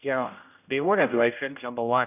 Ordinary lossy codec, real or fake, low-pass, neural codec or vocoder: none; fake; 3.6 kHz; codec, 16 kHz, 0.5 kbps, X-Codec, HuBERT features, trained on general audio